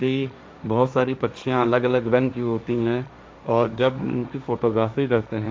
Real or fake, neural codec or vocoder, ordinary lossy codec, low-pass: fake; codec, 16 kHz, 1.1 kbps, Voila-Tokenizer; none; none